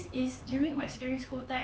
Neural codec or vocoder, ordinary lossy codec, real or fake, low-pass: codec, 16 kHz, 4 kbps, X-Codec, HuBERT features, trained on LibriSpeech; none; fake; none